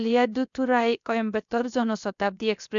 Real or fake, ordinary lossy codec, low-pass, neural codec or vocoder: fake; none; 7.2 kHz; codec, 16 kHz, about 1 kbps, DyCAST, with the encoder's durations